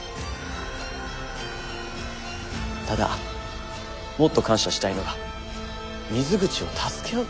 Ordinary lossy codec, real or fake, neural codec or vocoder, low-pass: none; real; none; none